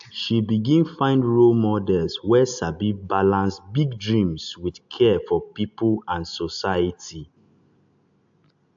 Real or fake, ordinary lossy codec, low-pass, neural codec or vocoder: real; AAC, 64 kbps; 7.2 kHz; none